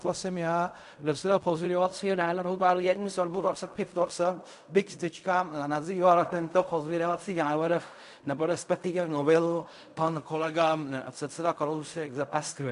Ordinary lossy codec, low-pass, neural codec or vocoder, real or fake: AAC, 96 kbps; 10.8 kHz; codec, 16 kHz in and 24 kHz out, 0.4 kbps, LongCat-Audio-Codec, fine tuned four codebook decoder; fake